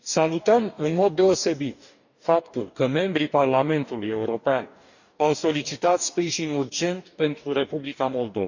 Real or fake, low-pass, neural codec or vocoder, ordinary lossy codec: fake; 7.2 kHz; codec, 44.1 kHz, 2.6 kbps, DAC; none